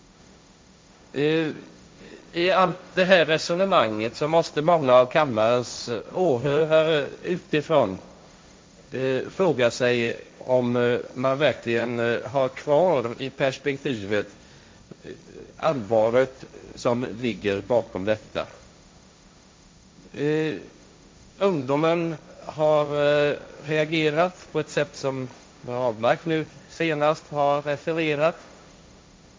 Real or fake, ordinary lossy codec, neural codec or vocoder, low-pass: fake; none; codec, 16 kHz, 1.1 kbps, Voila-Tokenizer; none